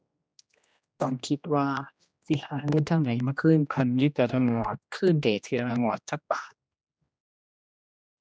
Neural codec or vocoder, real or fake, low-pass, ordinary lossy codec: codec, 16 kHz, 1 kbps, X-Codec, HuBERT features, trained on general audio; fake; none; none